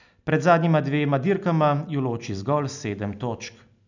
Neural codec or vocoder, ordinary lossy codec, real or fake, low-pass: none; none; real; 7.2 kHz